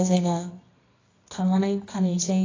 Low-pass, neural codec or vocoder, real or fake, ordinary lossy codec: 7.2 kHz; codec, 24 kHz, 0.9 kbps, WavTokenizer, medium music audio release; fake; AAC, 32 kbps